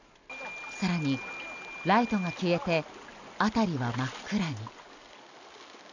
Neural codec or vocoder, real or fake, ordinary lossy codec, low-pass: none; real; none; 7.2 kHz